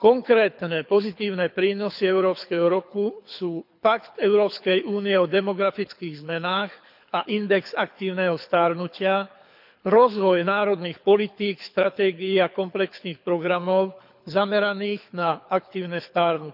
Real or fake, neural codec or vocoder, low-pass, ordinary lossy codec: fake; codec, 24 kHz, 6 kbps, HILCodec; 5.4 kHz; none